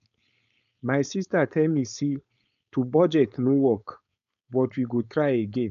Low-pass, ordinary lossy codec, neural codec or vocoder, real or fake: 7.2 kHz; none; codec, 16 kHz, 4.8 kbps, FACodec; fake